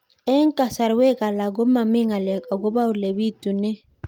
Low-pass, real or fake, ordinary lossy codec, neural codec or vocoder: 19.8 kHz; real; Opus, 32 kbps; none